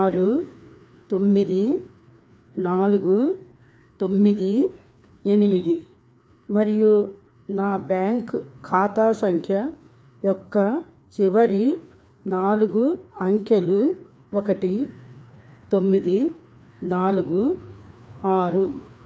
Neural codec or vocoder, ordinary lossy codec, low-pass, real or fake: codec, 16 kHz, 2 kbps, FreqCodec, larger model; none; none; fake